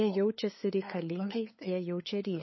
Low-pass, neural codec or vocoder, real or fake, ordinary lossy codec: 7.2 kHz; codec, 16 kHz, 8 kbps, FunCodec, trained on LibriTTS, 25 frames a second; fake; MP3, 24 kbps